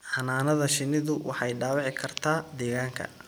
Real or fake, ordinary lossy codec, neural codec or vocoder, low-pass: real; none; none; none